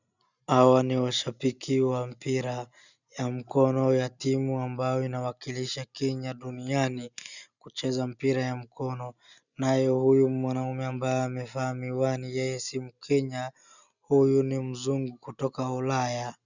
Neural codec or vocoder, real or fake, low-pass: none; real; 7.2 kHz